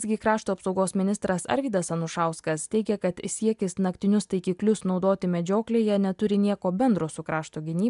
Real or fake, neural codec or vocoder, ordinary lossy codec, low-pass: real; none; MP3, 96 kbps; 10.8 kHz